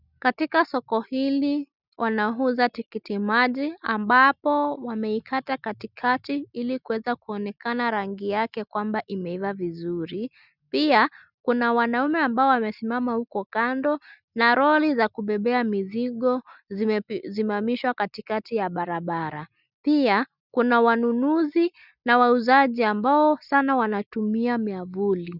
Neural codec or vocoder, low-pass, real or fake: none; 5.4 kHz; real